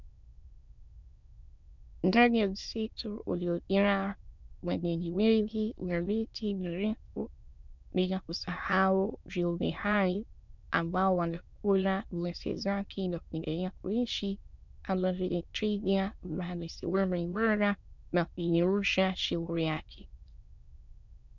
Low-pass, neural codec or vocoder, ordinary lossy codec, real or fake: 7.2 kHz; autoencoder, 22.05 kHz, a latent of 192 numbers a frame, VITS, trained on many speakers; MP3, 64 kbps; fake